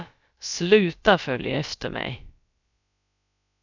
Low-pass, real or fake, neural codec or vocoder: 7.2 kHz; fake; codec, 16 kHz, about 1 kbps, DyCAST, with the encoder's durations